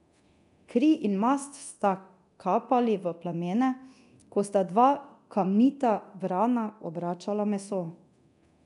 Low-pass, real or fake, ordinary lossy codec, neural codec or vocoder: 10.8 kHz; fake; none; codec, 24 kHz, 0.9 kbps, DualCodec